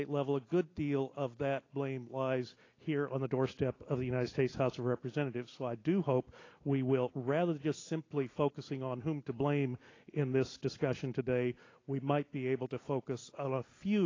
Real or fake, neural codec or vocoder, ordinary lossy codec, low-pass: real; none; AAC, 32 kbps; 7.2 kHz